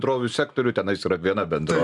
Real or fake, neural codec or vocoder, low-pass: real; none; 10.8 kHz